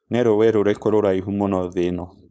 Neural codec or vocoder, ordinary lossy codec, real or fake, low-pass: codec, 16 kHz, 4.8 kbps, FACodec; none; fake; none